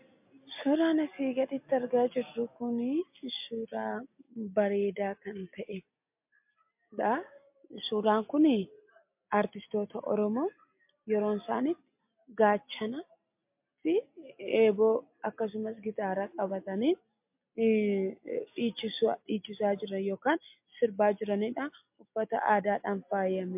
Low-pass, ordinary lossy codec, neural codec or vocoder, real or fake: 3.6 kHz; MP3, 32 kbps; none; real